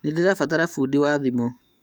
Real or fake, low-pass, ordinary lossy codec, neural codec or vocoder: fake; none; none; codec, 44.1 kHz, 7.8 kbps, DAC